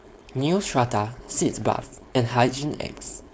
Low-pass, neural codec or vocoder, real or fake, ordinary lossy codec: none; codec, 16 kHz, 4.8 kbps, FACodec; fake; none